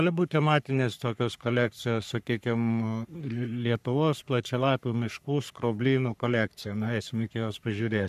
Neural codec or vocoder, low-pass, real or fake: codec, 44.1 kHz, 3.4 kbps, Pupu-Codec; 14.4 kHz; fake